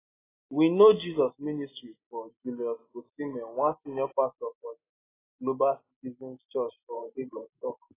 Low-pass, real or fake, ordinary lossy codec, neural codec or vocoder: 3.6 kHz; real; AAC, 16 kbps; none